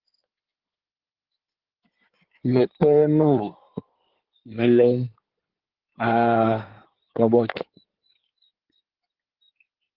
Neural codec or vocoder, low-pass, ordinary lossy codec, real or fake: codec, 16 kHz in and 24 kHz out, 2.2 kbps, FireRedTTS-2 codec; 5.4 kHz; Opus, 16 kbps; fake